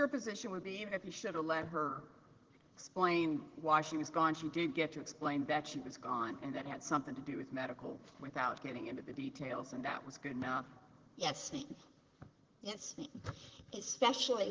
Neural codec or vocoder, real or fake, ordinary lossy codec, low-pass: vocoder, 44.1 kHz, 128 mel bands, Pupu-Vocoder; fake; Opus, 16 kbps; 7.2 kHz